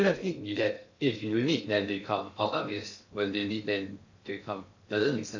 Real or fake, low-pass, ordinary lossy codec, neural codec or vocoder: fake; 7.2 kHz; AAC, 48 kbps; codec, 16 kHz in and 24 kHz out, 0.6 kbps, FocalCodec, streaming, 2048 codes